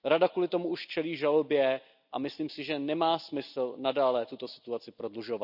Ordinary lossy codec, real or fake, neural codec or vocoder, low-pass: none; real; none; 5.4 kHz